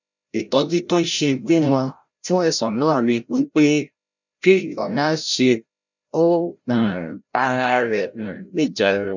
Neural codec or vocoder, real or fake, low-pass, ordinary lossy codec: codec, 16 kHz, 0.5 kbps, FreqCodec, larger model; fake; 7.2 kHz; none